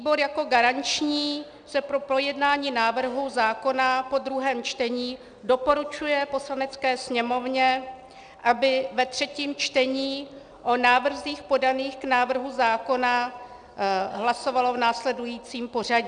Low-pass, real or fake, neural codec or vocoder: 9.9 kHz; real; none